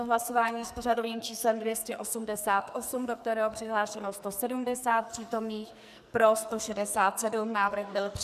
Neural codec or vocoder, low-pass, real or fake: codec, 44.1 kHz, 2.6 kbps, SNAC; 14.4 kHz; fake